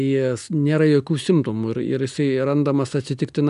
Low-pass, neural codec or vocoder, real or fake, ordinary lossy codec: 10.8 kHz; none; real; AAC, 96 kbps